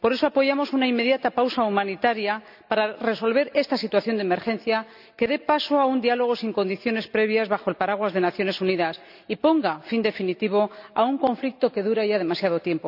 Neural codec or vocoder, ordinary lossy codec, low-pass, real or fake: none; none; 5.4 kHz; real